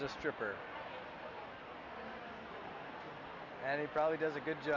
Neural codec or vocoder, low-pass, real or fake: none; 7.2 kHz; real